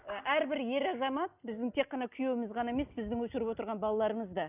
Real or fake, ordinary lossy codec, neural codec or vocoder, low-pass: real; none; none; 3.6 kHz